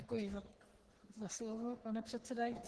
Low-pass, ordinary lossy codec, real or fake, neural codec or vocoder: 10.8 kHz; Opus, 16 kbps; fake; codec, 44.1 kHz, 3.4 kbps, Pupu-Codec